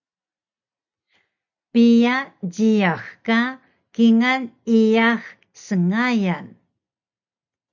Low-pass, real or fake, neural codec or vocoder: 7.2 kHz; real; none